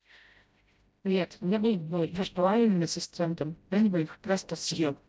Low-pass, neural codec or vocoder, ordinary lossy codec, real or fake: none; codec, 16 kHz, 0.5 kbps, FreqCodec, smaller model; none; fake